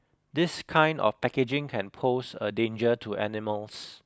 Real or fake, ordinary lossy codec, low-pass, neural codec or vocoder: real; none; none; none